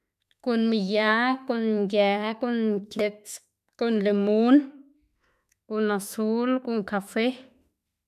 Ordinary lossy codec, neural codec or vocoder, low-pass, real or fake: none; autoencoder, 48 kHz, 32 numbers a frame, DAC-VAE, trained on Japanese speech; 14.4 kHz; fake